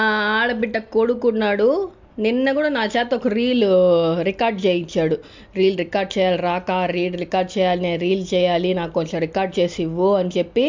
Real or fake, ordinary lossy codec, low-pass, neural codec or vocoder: real; MP3, 64 kbps; 7.2 kHz; none